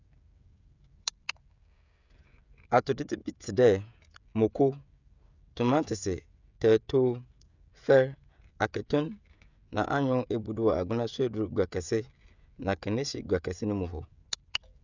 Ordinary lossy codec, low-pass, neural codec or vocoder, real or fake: none; 7.2 kHz; codec, 16 kHz, 16 kbps, FreqCodec, smaller model; fake